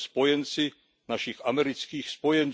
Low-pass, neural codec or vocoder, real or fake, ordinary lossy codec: none; none; real; none